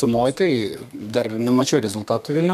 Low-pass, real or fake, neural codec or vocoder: 14.4 kHz; fake; codec, 32 kHz, 1.9 kbps, SNAC